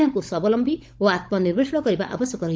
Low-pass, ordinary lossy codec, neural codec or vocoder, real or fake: none; none; codec, 16 kHz, 16 kbps, FunCodec, trained on LibriTTS, 50 frames a second; fake